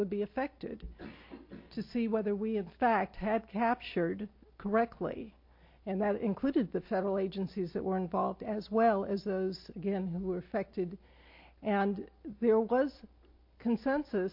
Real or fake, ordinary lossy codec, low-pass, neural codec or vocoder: real; MP3, 32 kbps; 5.4 kHz; none